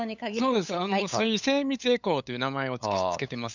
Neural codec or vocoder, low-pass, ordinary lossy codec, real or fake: codec, 16 kHz, 8 kbps, FunCodec, trained on LibriTTS, 25 frames a second; 7.2 kHz; none; fake